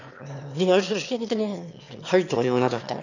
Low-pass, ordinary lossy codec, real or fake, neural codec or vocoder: 7.2 kHz; none; fake; autoencoder, 22.05 kHz, a latent of 192 numbers a frame, VITS, trained on one speaker